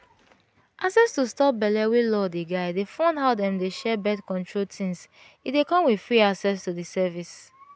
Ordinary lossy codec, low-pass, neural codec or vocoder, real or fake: none; none; none; real